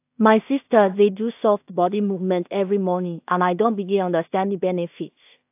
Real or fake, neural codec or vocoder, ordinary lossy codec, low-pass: fake; codec, 16 kHz in and 24 kHz out, 0.4 kbps, LongCat-Audio-Codec, two codebook decoder; AAC, 32 kbps; 3.6 kHz